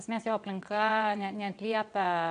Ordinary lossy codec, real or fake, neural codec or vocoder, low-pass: AAC, 64 kbps; fake; vocoder, 22.05 kHz, 80 mel bands, WaveNeXt; 9.9 kHz